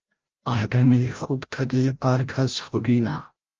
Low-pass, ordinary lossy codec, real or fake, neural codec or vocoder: 7.2 kHz; Opus, 24 kbps; fake; codec, 16 kHz, 0.5 kbps, FreqCodec, larger model